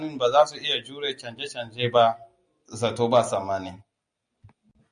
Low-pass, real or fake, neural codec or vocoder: 9.9 kHz; real; none